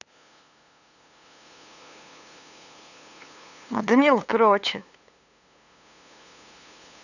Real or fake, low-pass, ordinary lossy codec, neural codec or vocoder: fake; 7.2 kHz; none; codec, 16 kHz, 2 kbps, FunCodec, trained on LibriTTS, 25 frames a second